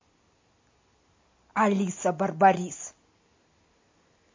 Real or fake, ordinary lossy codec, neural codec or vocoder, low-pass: real; MP3, 32 kbps; none; 7.2 kHz